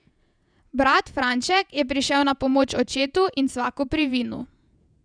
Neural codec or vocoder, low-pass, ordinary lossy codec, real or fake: vocoder, 48 kHz, 128 mel bands, Vocos; 9.9 kHz; none; fake